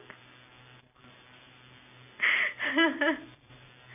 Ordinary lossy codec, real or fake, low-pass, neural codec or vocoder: none; fake; 3.6 kHz; vocoder, 44.1 kHz, 128 mel bands every 256 samples, BigVGAN v2